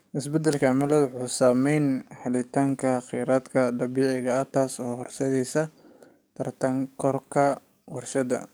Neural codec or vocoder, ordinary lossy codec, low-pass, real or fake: codec, 44.1 kHz, 7.8 kbps, DAC; none; none; fake